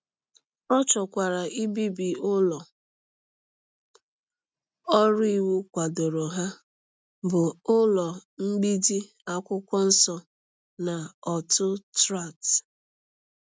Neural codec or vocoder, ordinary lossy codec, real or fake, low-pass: none; none; real; none